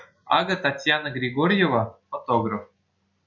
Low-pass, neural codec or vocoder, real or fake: 7.2 kHz; none; real